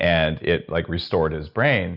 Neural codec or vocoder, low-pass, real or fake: none; 5.4 kHz; real